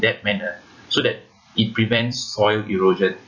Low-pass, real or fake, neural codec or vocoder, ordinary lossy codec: 7.2 kHz; fake; vocoder, 44.1 kHz, 128 mel bands every 256 samples, BigVGAN v2; none